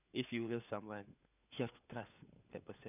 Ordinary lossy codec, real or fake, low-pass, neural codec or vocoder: none; fake; 3.6 kHz; codec, 16 kHz, 4 kbps, FunCodec, trained on LibriTTS, 50 frames a second